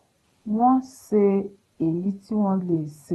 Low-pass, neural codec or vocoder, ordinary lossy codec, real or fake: 19.8 kHz; vocoder, 44.1 kHz, 128 mel bands every 512 samples, BigVGAN v2; AAC, 32 kbps; fake